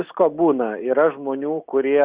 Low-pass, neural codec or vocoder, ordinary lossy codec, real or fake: 3.6 kHz; none; Opus, 64 kbps; real